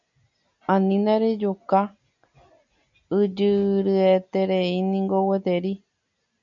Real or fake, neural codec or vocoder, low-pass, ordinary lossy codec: real; none; 7.2 kHz; MP3, 96 kbps